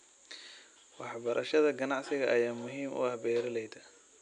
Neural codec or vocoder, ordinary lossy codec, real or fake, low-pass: none; AAC, 96 kbps; real; 9.9 kHz